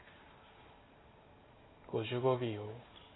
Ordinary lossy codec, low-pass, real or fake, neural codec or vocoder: AAC, 16 kbps; 7.2 kHz; real; none